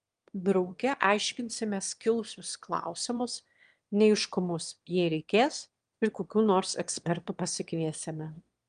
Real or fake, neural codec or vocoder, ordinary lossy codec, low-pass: fake; autoencoder, 22.05 kHz, a latent of 192 numbers a frame, VITS, trained on one speaker; Opus, 32 kbps; 9.9 kHz